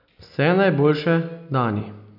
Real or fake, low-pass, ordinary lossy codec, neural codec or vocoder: real; 5.4 kHz; none; none